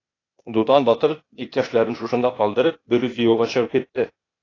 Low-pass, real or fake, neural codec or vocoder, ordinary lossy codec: 7.2 kHz; fake; codec, 16 kHz, 0.8 kbps, ZipCodec; AAC, 32 kbps